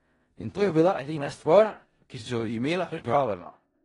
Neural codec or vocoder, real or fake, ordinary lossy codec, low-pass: codec, 16 kHz in and 24 kHz out, 0.4 kbps, LongCat-Audio-Codec, four codebook decoder; fake; AAC, 32 kbps; 10.8 kHz